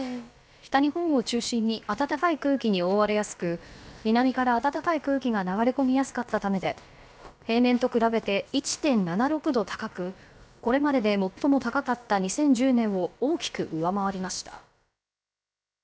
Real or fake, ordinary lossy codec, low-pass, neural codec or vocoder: fake; none; none; codec, 16 kHz, about 1 kbps, DyCAST, with the encoder's durations